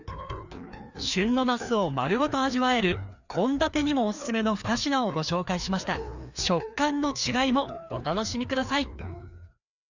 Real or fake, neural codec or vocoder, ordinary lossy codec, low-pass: fake; codec, 16 kHz, 2 kbps, FreqCodec, larger model; none; 7.2 kHz